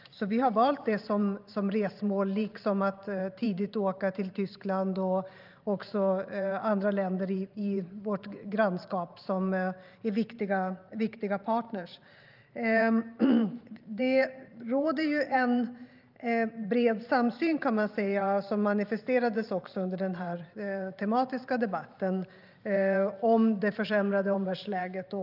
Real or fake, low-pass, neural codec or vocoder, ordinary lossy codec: fake; 5.4 kHz; vocoder, 44.1 kHz, 128 mel bands every 512 samples, BigVGAN v2; Opus, 24 kbps